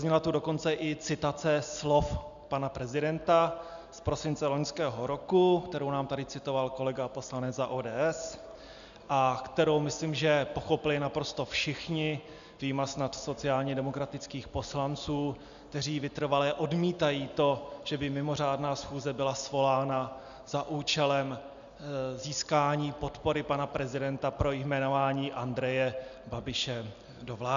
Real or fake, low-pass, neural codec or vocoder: real; 7.2 kHz; none